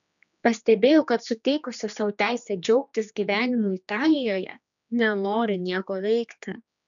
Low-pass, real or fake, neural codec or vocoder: 7.2 kHz; fake; codec, 16 kHz, 2 kbps, X-Codec, HuBERT features, trained on general audio